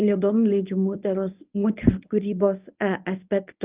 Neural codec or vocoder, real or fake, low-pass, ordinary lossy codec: codec, 24 kHz, 0.9 kbps, WavTokenizer, medium speech release version 1; fake; 3.6 kHz; Opus, 32 kbps